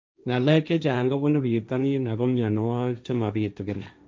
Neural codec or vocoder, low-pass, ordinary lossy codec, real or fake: codec, 16 kHz, 1.1 kbps, Voila-Tokenizer; none; none; fake